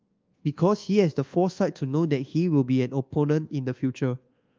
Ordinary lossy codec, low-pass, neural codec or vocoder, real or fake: Opus, 32 kbps; 7.2 kHz; codec, 24 kHz, 1.2 kbps, DualCodec; fake